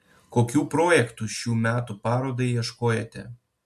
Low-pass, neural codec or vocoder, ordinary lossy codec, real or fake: 14.4 kHz; none; MP3, 64 kbps; real